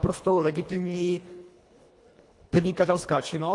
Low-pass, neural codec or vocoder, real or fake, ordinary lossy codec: 10.8 kHz; codec, 24 kHz, 1.5 kbps, HILCodec; fake; AAC, 48 kbps